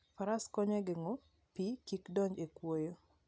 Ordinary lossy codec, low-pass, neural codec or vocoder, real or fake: none; none; none; real